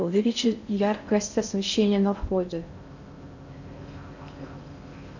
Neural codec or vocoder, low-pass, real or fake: codec, 16 kHz in and 24 kHz out, 0.6 kbps, FocalCodec, streaming, 4096 codes; 7.2 kHz; fake